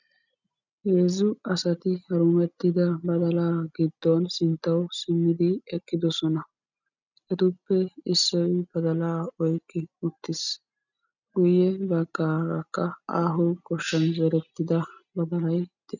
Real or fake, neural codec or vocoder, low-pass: real; none; 7.2 kHz